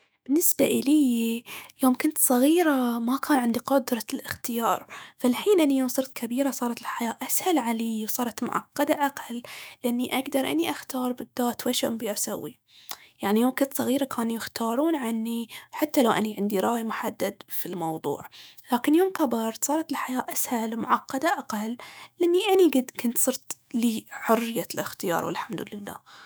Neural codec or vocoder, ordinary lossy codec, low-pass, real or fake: autoencoder, 48 kHz, 128 numbers a frame, DAC-VAE, trained on Japanese speech; none; none; fake